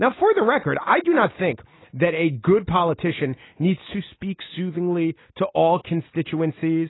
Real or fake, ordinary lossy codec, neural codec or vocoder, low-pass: real; AAC, 16 kbps; none; 7.2 kHz